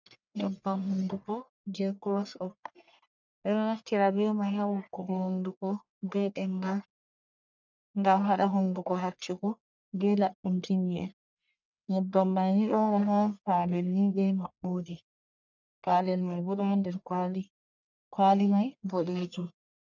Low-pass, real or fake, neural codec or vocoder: 7.2 kHz; fake; codec, 44.1 kHz, 1.7 kbps, Pupu-Codec